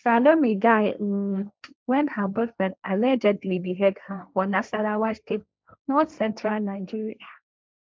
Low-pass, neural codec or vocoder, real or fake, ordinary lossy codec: none; codec, 16 kHz, 1.1 kbps, Voila-Tokenizer; fake; none